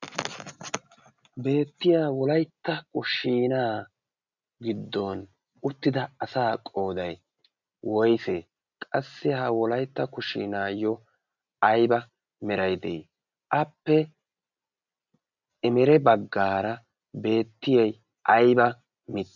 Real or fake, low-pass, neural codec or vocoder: real; 7.2 kHz; none